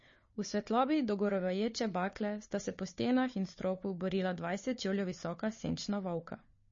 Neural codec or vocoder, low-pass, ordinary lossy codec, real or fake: codec, 16 kHz, 4 kbps, FunCodec, trained on Chinese and English, 50 frames a second; 7.2 kHz; MP3, 32 kbps; fake